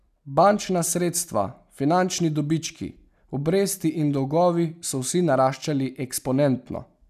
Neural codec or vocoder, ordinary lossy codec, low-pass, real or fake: none; none; 14.4 kHz; real